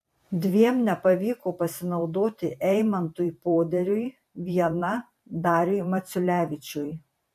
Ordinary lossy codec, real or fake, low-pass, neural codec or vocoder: MP3, 64 kbps; fake; 14.4 kHz; vocoder, 44.1 kHz, 128 mel bands every 512 samples, BigVGAN v2